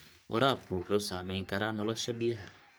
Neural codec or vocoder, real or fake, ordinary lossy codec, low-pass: codec, 44.1 kHz, 3.4 kbps, Pupu-Codec; fake; none; none